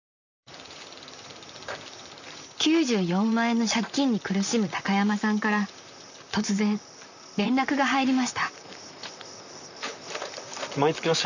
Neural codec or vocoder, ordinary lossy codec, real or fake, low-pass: vocoder, 44.1 kHz, 128 mel bands, Pupu-Vocoder; none; fake; 7.2 kHz